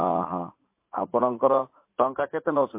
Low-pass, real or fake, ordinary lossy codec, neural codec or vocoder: 3.6 kHz; fake; MP3, 24 kbps; vocoder, 44.1 kHz, 80 mel bands, Vocos